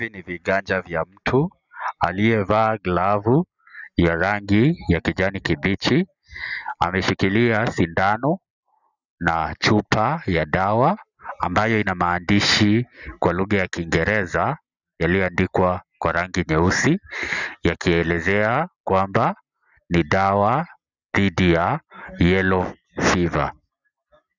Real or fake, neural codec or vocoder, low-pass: real; none; 7.2 kHz